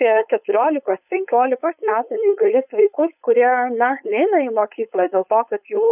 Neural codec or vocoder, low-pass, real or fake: codec, 16 kHz, 4.8 kbps, FACodec; 3.6 kHz; fake